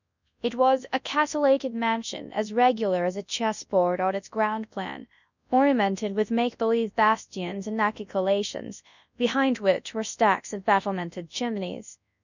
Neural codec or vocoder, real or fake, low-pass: codec, 24 kHz, 0.9 kbps, WavTokenizer, large speech release; fake; 7.2 kHz